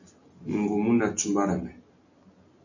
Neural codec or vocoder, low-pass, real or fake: none; 7.2 kHz; real